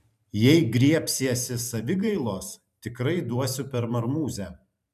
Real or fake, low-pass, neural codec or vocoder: fake; 14.4 kHz; vocoder, 44.1 kHz, 128 mel bands every 512 samples, BigVGAN v2